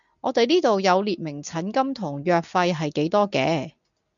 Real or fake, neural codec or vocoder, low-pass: real; none; 7.2 kHz